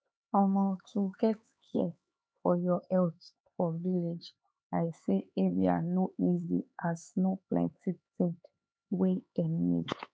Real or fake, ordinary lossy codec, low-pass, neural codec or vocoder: fake; none; none; codec, 16 kHz, 4 kbps, X-Codec, HuBERT features, trained on LibriSpeech